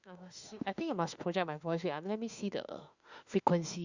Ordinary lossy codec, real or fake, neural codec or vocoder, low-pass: Opus, 64 kbps; fake; autoencoder, 48 kHz, 32 numbers a frame, DAC-VAE, trained on Japanese speech; 7.2 kHz